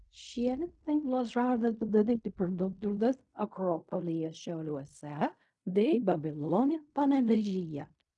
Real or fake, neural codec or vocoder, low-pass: fake; codec, 16 kHz in and 24 kHz out, 0.4 kbps, LongCat-Audio-Codec, fine tuned four codebook decoder; 10.8 kHz